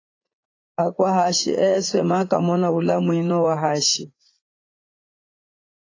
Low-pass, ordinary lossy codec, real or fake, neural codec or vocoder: 7.2 kHz; AAC, 32 kbps; fake; vocoder, 44.1 kHz, 80 mel bands, Vocos